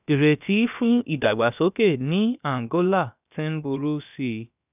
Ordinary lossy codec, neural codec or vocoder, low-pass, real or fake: none; codec, 16 kHz, about 1 kbps, DyCAST, with the encoder's durations; 3.6 kHz; fake